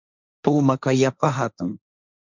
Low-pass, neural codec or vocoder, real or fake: 7.2 kHz; codec, 16 kHz, 1.1 kbps, Voila-Tokenizer; fake